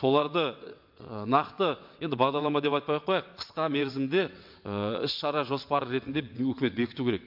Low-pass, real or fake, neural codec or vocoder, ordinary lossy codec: 5.4 kHz; fake; vocoder, 22.05 kHz, 80 mel bands, WaveNeXt; MP3, 48 kbps